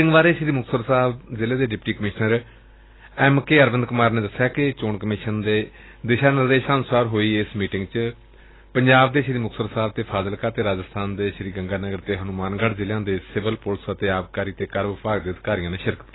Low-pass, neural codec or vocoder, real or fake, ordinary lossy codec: 7.2 kHz; none; real; AAC, 16 kbps